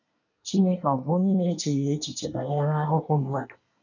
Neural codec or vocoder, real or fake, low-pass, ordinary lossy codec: codec, 24 kHz, 1 kbps, SNAC; fake; 7.2 kHz; none